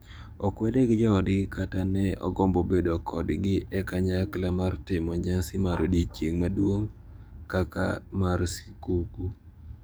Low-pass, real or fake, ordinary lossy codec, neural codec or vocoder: none; fake; none; codec, 44.1 kHz, 7.8 kbps, DAC